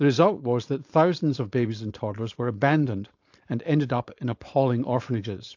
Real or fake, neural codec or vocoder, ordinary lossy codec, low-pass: fake; codec, 16 kHz, 4.8 kbps, FACodec; AAC, 48 kbps; 7.2 kHz